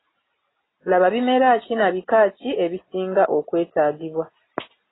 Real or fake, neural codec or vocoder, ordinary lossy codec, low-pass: real; none; AAC, 16 kbps; 7.2 kHz